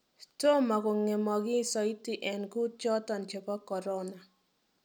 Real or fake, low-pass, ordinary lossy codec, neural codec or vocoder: real; none; none; none